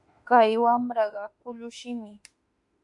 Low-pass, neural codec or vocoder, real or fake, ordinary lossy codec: 10.8 kHz; autoencoder, 48 kHz, 32 numbers a frame, DAC-VAE, trained on Japanese speech; fake; MP3, 64 kbps